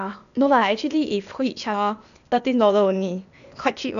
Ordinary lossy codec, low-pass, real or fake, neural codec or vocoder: none; 7.2 kHz; fake; codec, 16 kHz, 0.8 kbps, ZipCodec